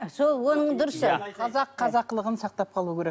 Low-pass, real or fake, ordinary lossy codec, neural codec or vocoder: none; real; none; none